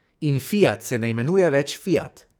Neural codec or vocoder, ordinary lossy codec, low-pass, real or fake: codec, 44.1 kHz, 2.6 kbps, SNAC; none; none; fake